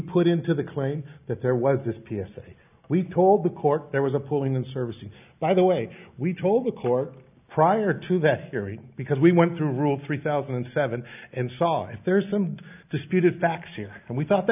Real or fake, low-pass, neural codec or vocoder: real; 3.6 kHz; none